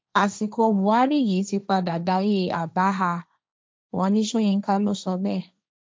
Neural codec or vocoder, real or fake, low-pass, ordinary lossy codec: codec, 16 kHz, 1.1 kbps, Voila-Tokenizer; fake; none; none